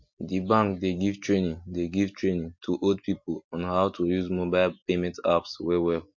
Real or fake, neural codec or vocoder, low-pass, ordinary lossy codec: real; none; 7.2 kHz; MP3, 48 kbps